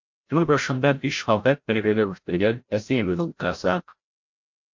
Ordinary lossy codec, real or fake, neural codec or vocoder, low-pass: MP3, 48 kbps; fake; codec, 16 kHz, 0.5 kbps, FreqCodec, larger model; 7.2 kHz